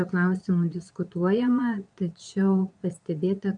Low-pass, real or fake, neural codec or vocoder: 9.9 kHz; fake; vocoder, 22.05 kHz, 80 mel bands, Vocos